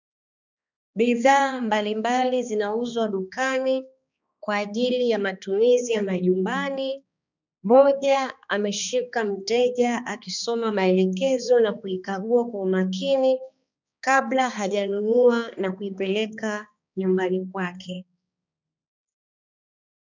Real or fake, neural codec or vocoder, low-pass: fake; codec, 16 kHz, 2 kbps, X-Codec, HuBERT features, trained on balanced general audio; 7.2 kHz